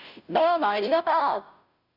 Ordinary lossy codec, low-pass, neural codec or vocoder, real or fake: none; 5.4 kHz; codec, 16 kHz, 0.5 kbps, FunCodec, trained on Chinese and English, 25 frames a second; fake